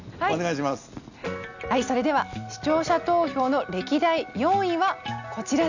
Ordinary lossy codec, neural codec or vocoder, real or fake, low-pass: none; none; real; 7.2 kHz